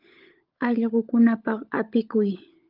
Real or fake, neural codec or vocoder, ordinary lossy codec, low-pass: fake; codec, 16 kHz, 16 kbps, FunCodec, trained on LibriTTS, 50 frames a second; Opus, 24 kbps; 5.4 kHz